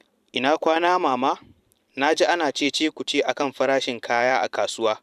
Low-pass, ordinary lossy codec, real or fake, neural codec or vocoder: 14.4 kHz; none; fake; vocoder, 44.1 kHz, 128 mel bands every 512 samples, BigVGAN v2